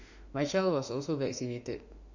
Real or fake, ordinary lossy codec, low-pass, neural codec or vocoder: fake; none; 7.2 kHz; autoencoder, 48 kHz, 32 numbers a frame, DAC-VAE, trained on Japanese speech